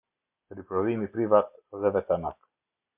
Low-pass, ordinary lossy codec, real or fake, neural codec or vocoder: 3.6 kHz; MP3, 32 kbps; real; none